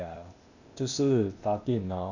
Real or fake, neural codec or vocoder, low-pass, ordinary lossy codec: fake; codec, 16 kHz, 0.8 kbps, ZipCodec; 7.2 kHz; none